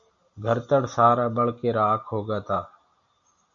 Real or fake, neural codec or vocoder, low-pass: real; none; 7.2 kHz